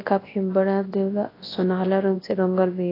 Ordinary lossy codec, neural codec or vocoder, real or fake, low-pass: AAC, 24 kbps; codec, 24 kHz, 0.9 kbps, WavTokenizer, large speech release; fake; 5.4 kHz